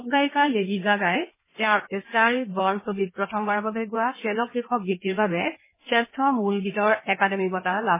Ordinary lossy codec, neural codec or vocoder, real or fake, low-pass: MP3, 16 kbps; codec, 16 kHz in and 24 kHz out, 1.1 kbps, FireRedTTS-2 codec; fake; 3.6 kHz